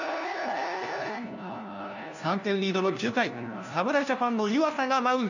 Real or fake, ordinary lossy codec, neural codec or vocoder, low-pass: fake; none; codec, 16 kHz, 1 kbps, FunCodec, trained on LibriTTS, 50 frames a second; 7.2 kHz